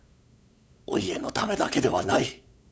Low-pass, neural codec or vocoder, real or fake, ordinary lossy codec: none; codec, 16 kHz, 8 kbps, FunCodec, trained on LibriTTS, 25 frames a second; fake; none